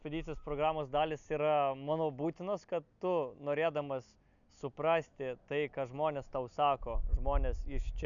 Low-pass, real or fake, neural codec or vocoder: 7.2 kHz; real; none